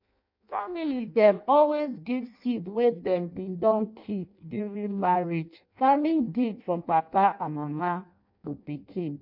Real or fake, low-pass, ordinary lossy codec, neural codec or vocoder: fake; 5.4 kHz; MP3, 48 kbps; codec, 16 kHz in and 24 kHz out, 0.6 kbps, FireRedTTS-2 codec